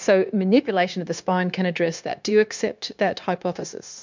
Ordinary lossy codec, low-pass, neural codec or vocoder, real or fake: MP3, 64 kbps; 7.2 kHz; codec, 16 kHz, 0.9 kbps, LongCat-Audio-Codec; fake